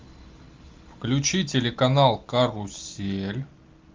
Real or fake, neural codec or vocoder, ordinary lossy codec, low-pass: real; none; Opus, 24 kbps; 7.2 kHz